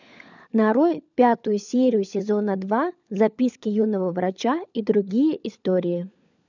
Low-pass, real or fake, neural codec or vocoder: 7.2 kHz; fake; codec, 16 kHz, 16 kbps, FunCodec, trained on LibriTTS, 50 frames a second